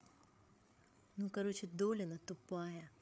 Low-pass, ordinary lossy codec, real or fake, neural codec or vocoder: none; none; fake; codec, 16 kHz, 8 kbps, FreqCodec, larger model